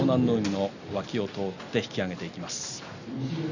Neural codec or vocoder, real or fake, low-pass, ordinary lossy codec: none; real; 7.2 kHz; none